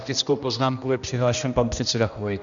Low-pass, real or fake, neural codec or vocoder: 7.2 kHz; fake; codec, 16 kHz, 1 kbps, X-Codec, HuBERT features, trained on general audio